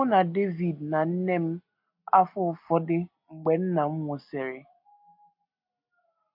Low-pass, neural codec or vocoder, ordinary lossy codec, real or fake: 5.4 kHz; none; MP3, 32 kbps; real